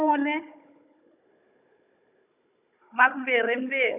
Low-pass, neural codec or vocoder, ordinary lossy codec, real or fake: 3.6 kHz; codec, 16 kHz, 16 kbps, FunCodec, trained on Chinese and English, 50 frames a second; none; fake